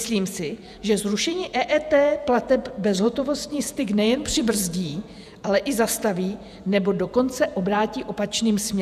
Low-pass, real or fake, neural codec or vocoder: 14.4 kHz; fake; vocoder, 48 kHz, 128 mel bands, Vocos